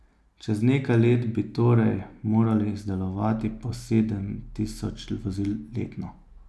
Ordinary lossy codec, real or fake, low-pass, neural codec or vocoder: none; real; none; none